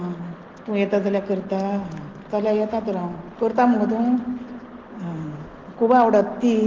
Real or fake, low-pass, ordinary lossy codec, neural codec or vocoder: real; 7.2 kHz; Opus, 16 kbps; none